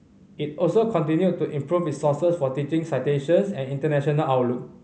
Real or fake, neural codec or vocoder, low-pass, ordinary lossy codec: real; none; none; none